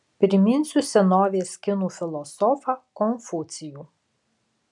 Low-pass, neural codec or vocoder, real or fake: 10.8 kHz; none; real